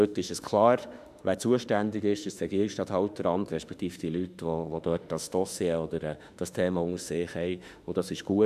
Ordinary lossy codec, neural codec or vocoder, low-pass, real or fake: none; autoencoder, 48 kHz, 32 numbers a frame, DAC-VAE, trained on Japanese speech; 14.4 kHz; fake